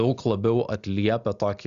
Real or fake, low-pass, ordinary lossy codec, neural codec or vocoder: real; 7.2 kHz; Opus, 64 kbps; none